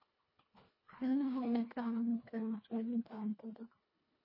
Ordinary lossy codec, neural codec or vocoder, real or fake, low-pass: MP3, 24 kbps; codec, 24 kHz, 1.5 kbps, HILCodec; fake; 5.4 kHz